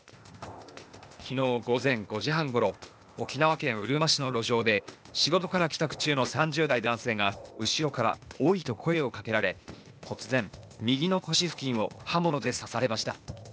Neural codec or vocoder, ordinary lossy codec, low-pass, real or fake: codec, 16 kHz, 0.8 kbps, ZipCodec; none; none; fake